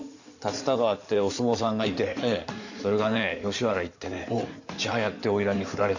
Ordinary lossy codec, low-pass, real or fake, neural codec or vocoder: none; 7.2 kHz; fake; codec, 16 kHz in and 24 kHz out, 2.2 kbps, FireRedTTS-2 codec